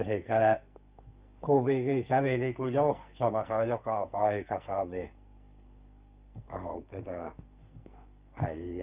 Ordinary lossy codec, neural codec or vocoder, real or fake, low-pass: Opus, 64 kbps; codec, 44.1 kHz, 2.6 kbps, SNAC; fake; 3.6 kHz